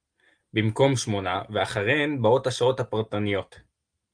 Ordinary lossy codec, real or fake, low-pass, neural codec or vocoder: Opus, 32 kbps; real; 9.9 kHz; none